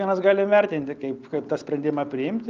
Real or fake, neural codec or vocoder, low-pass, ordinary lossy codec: real; none; 7.2 kHz; Opus, 32 kbps